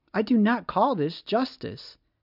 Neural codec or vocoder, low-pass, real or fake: none; 5.4 kHz; real